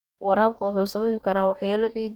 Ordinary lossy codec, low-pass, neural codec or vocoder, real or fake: none; 19.8 kHz; codec, 44.1 kHz, 2.6 kbps, DAC; fake